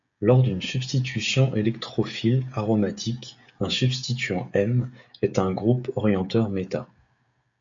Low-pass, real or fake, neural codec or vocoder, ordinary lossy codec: 7.2 kHz; fake; codec, 16 kHz, 16 kbps, FreqCodec, smaller model; AAC, 64 kbps